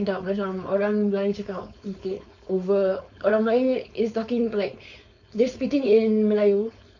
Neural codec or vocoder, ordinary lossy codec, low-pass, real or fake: codec, 16 kHz, 4.8 kbps, FACodec; none; 7.2 kHz; fake